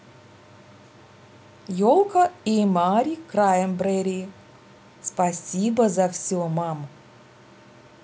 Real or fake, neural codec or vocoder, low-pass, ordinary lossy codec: real; none; none; none